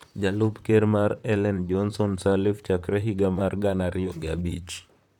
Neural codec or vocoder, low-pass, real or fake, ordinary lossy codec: vocoder, 44.1 kHz, 128 mel bands, Pupu-Vocoder; 19.8 kHz; fake; none